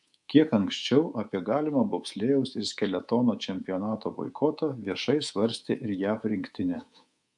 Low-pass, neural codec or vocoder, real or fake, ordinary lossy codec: 10.8 kHz; codec, 24 kHz, 3.1 kbps, DualCodec; fake; MP3, 64 kbps